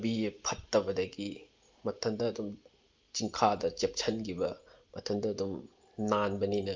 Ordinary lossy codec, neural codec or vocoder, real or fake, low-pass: Opus, 24 kbps; none; real; 7.2 kHz